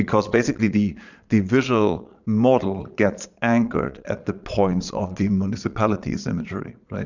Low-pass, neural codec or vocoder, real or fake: 7.2 kHz; vocoder, 22.05 kHz, 80 mel bands, Vocos; fake